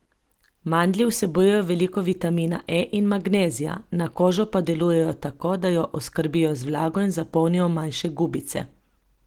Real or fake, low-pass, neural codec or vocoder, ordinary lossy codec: real; 19.8 kHz; none; Opus, 16 kbps